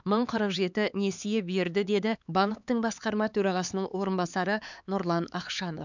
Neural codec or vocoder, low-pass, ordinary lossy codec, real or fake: codec, 16 kHz, 4 kbps, X-Codec, HuBERT features, trained on LibriSpeech; 7.2 kHz; none; fake